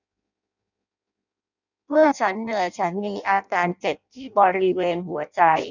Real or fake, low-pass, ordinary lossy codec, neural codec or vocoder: fake; 7.2 kHz; none; codec, 16 kHz in and 24 kHz out, 0.6 kbps, FireRedTTS-2 codec